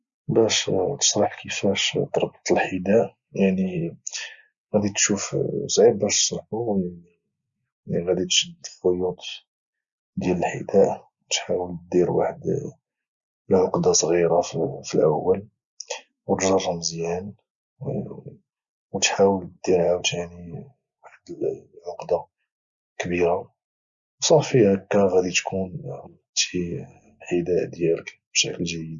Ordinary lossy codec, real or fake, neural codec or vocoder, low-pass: Opus, 64 kbps; real; none; 7.2 kHz